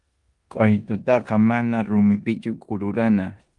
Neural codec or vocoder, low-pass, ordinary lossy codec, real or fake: codec, 16 kHz in and 24 kHz out, 0.9 kbps, LongCat-Audio-Codec, four codebook decoder; 10.8 kHz; Opus, 32 kbps; fake